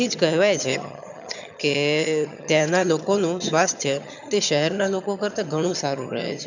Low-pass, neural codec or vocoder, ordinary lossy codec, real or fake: 7.2 kHz; vocoder, 22.05 kHz, 80 mel bands, HiFi-GAN; none; fake